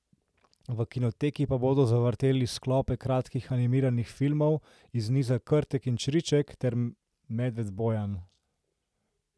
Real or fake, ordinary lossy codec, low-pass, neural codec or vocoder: real; none; none; none